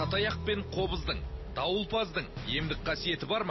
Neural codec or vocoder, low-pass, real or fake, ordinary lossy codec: none; 7.2 kHz; real; MP3, 24 kbps